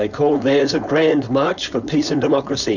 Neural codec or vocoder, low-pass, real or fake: codec, 16 kHz, 4.8 kbps, FACodec; 7.2 kHz; fake